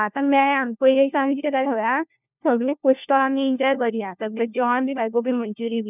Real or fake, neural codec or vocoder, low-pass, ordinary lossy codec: fake; codec, 16 kHz, 1 kbps, FunCodec, trained on LibriTTS, 50 frames a second; 3.6 kHz; none